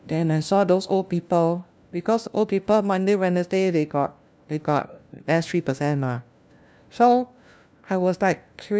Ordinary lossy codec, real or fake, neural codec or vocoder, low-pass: none; fake; codec, 16 kHz, 1 kbps, FunCodec, trained on LibriTTS, 50 frames a second; none